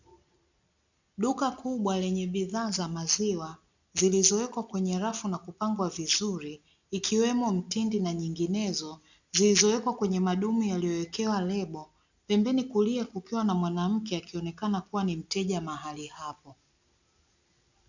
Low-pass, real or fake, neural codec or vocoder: 7.2 kHz; real; none